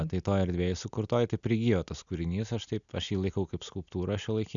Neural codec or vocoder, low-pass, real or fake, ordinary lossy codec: none; 7.2 kHz; real; MP3, 96 kbps